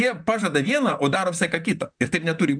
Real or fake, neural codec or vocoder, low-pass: fake; vocoder, 44.1 kHz, 128 mel bands, Pupu-Vocoder; 9.9 kHz